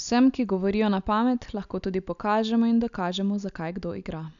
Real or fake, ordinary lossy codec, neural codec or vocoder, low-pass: real; none; none; 7.2 kHz